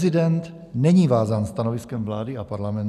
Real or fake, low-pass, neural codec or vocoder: real; 14.4 kHz; none